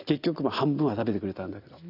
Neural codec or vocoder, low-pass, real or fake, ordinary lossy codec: none; 5.4 kHz; real; none